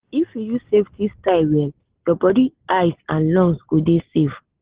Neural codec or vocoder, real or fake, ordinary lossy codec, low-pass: none; real; Opus, 16 kbps; 3.6 kHz